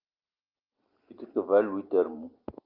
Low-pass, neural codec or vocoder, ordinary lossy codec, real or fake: 5.4 kHz; none; Opus, 32 kbps; real